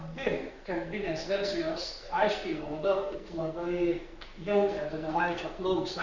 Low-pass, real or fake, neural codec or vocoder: 7.2 kHz; fake; codec, 44.1 kHz, 2.6 kbps, SNAC